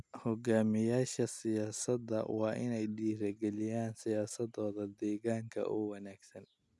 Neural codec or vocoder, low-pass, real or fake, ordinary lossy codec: none; none; real; none